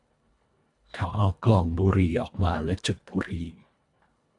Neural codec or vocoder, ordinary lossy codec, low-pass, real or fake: codec, 24 kHz, 1.5 kbps, HILCodec; AAC, 64 kbps; 10.8 kHz; fake